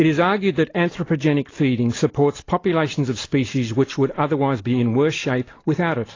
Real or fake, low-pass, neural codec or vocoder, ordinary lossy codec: real; 7.2 kHz; none; AAC, 32 kbps